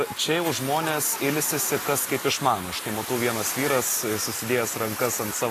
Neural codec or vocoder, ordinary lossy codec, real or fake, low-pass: none; AAC, 48 kbps; real; 14.4 kHz